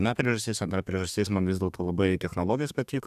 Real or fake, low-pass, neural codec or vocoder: fake; 14.4 kHz; codec, 32 kHz, 1.9 kbps, SNAC